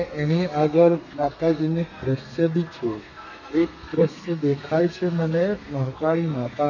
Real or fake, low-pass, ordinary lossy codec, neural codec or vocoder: fake; 7.2 kHz; none; codec, 32 kHz, 1.9 kbps, SNAC